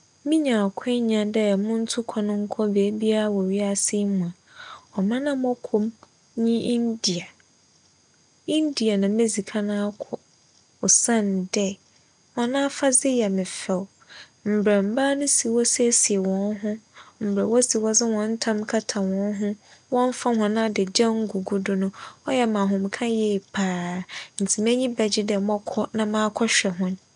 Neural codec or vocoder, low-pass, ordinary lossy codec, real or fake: none; 9.9 kHz; none; real